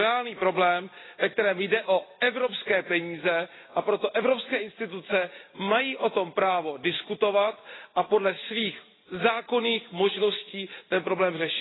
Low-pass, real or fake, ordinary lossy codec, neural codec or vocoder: 7.2 kHz; real; AAC, 16 kbps; none